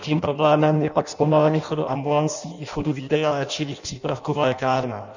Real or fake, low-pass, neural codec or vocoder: fake; 7.2 kHz; codec, 16 kHz in and 24 kHz out, 0.6 kbps, FireRedTTS-2 codec